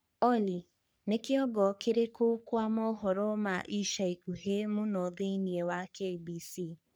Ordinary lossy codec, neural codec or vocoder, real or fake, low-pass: none; codec, 44.1 kHz, 3.4 kbps, Pupu-Codec; fake; none